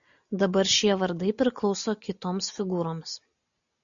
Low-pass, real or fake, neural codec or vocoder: 7.2 kHz; real; none